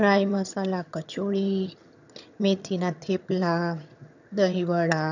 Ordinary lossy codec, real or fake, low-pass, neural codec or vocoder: none; fake; 7.2 kHz; vocoder, 22.05 kHz, 80 mel bands, HiFi-GAN